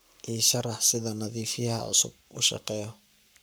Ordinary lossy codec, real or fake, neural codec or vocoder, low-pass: none; fake; codec, 44.1 kHz, 7.8 kbps, Pupu-Codec; none